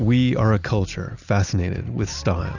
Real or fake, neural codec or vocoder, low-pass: real; none; 7.2 kHz